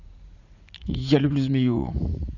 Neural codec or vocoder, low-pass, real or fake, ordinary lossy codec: vocoder, 44.1 kHz, 80 mel bands, Vocos; 7.2 kHz; fake; none